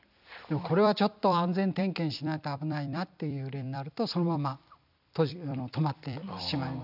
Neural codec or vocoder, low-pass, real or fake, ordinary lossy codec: vocoder, 44.1 kHz, 128 mel bands every 256 samples, BigVGAN v2; 5.4 kHz; fake; none